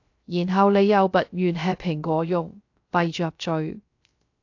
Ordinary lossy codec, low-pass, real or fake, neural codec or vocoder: AAC, 48 kbps; 7.2 kHz; fake; codec, 16 kHz, 0.3 kbps, FocalCodec